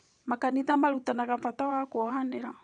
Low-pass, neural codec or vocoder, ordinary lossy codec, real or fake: 9.9 kHz; vocoder, 22.05 kHz, 80 mel bands, WaveNeXt; none; fake